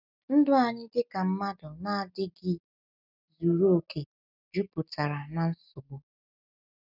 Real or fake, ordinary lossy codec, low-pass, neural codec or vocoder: real; none; 5.4 kHz; none